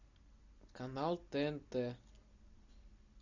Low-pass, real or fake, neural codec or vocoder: 7.2 kHz; real; none